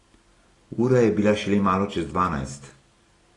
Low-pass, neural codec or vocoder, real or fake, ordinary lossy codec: 10.8 kHz; none; real; AAC, 32 kbps